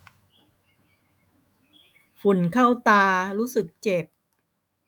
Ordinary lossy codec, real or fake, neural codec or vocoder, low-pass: none; fake; autoencoder, 48 kHz, 128 numbers a frame, DAC-VAE, trained on Japanese speech; none